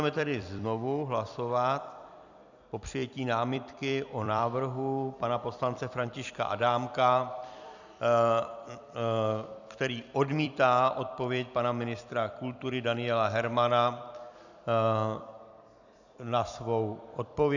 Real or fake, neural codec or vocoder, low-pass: fake; vocoder, 44.1 kHz, 128 mel bands every 256 samples, BigVGAN v2; 7.2 kHz